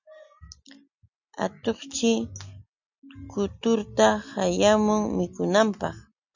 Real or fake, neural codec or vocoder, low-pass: real; none; 7.2 kHz